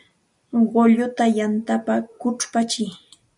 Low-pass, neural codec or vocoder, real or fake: 10.8 kHz; none; real